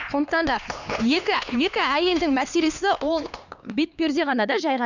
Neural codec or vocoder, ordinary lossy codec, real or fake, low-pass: codec, 16 kHz, 2 kbps, X-Codec, HuBERT features, trained on LibriSpeech; none; fake; 7.2 kHz